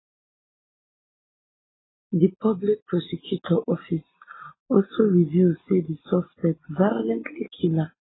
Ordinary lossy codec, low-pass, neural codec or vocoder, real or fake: AAC, 16 kbps; 7.2 kHz; none; real